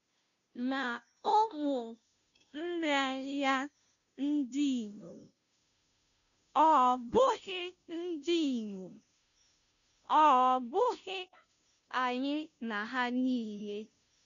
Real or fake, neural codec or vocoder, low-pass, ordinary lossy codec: fake; codec, 16 kHz, 0.5 kbps, FunCodec, trained on Chinese and English, 25 frames a second; 7.2 kHz; none